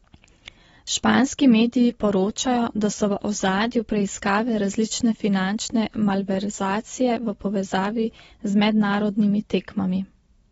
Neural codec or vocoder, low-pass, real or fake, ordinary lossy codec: none; 19.8 kHz; real; AAC, 24 kbps